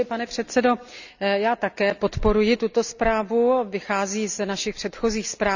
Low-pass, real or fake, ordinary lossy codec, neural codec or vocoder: 7.2 kHz; real; none; none